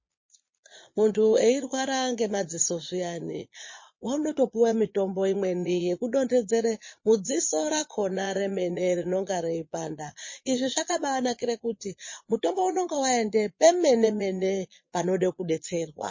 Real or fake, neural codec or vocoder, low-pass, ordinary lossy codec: fake; vocoder, 22.05 kHz, 80 mel bands, Vocos; 7.2 kHz; MP3, 32 kbps